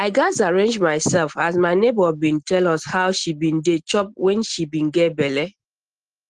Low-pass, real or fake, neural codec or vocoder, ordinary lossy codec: 9.9 kHz; real; none; Opus, 16 kbps